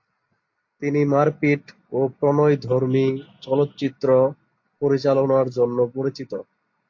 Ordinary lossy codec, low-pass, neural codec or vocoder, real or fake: AAC, 48 kbps; 7.2 kHz; none; real